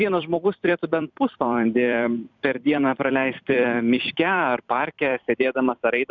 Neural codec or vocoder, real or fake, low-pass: vocoder, 44.1 kHz, 128 mel bands every 256 samples, BigVGAN v2; fake; 7.2 kHz